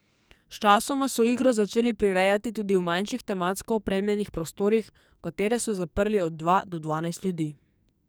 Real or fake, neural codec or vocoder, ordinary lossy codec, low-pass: fake; codec, 44.1 kHz, 2.6 kbps, SNAC; none; none